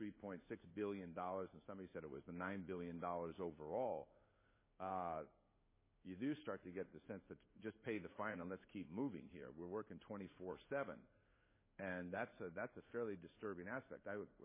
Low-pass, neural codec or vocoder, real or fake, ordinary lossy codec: 3.6 kHz; codec, 16 kHz in and 24 kHz out, 1 kbps, XY-Tokenizer; fake; MP3, 16 kbps